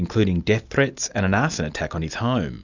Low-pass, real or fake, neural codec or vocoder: 7.2 kHz; real; none